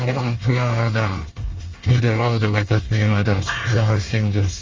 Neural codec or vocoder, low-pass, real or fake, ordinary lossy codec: codec, 24 kHz, 1 kbps, SNAC; 7.2 kHz; fake; Opus, 32 kbps